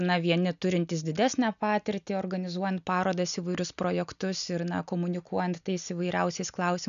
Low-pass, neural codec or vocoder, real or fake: 7.2 kHz; none; real